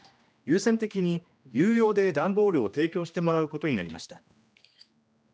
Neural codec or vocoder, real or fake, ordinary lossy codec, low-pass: codec, 16 kHz, 1 kbps, X-Codec, HuBERT features, trained on general audio; fake; none; none